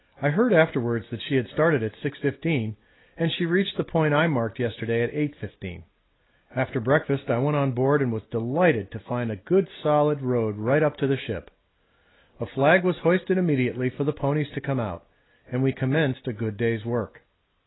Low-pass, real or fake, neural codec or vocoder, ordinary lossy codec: 7.2 kHz; real; none; AAC, 16 kbps